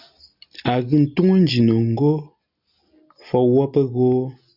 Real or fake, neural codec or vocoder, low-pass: real; none; 5.4 kHz